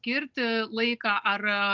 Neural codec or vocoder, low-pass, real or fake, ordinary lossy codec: none; 7.2 kHz; real; Opus, 24 kbps